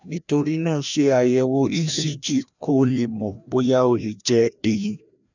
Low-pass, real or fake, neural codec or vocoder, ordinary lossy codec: 7.2 kHz; fake; codec, 16 kHz, 1 kbps, FreqCodec, larger model; none